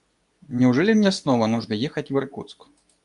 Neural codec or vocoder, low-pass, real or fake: codec, 24 kHz, 0.9 kbps, WavTokenizer, medium speech release version 2; 10.8 kHz; fake